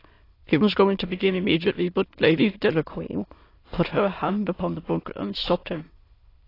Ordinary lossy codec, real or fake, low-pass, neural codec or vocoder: AAC, 24 kbps; fake; 5.4 kHz; autoencoder, 22.05 kHz, a latent of 192 numbers a frame, VITS, trained on many speakers